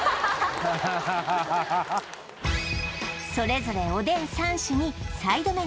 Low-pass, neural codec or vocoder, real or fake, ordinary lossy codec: none; none; real; none